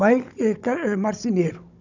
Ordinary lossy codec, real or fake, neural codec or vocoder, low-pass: none; fake; codec, 16 kHz, 16 kbps, FunCodec, trained on Chinese and English, 50 frames a second; 7.2 kHz